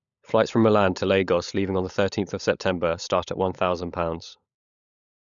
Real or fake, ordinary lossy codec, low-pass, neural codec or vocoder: fake; none; 7.2 kHz; codec, 16 kHz, 16 kbps, FunCodec, trained on LibriTTS, 50 frames a second